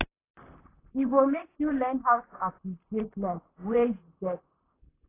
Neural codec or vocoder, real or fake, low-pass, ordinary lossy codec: vocoder, 44.1 kHz, 128 mel bands, Pupu-Vocoder; fake; 3.6 kHz; AAC, 24 kbps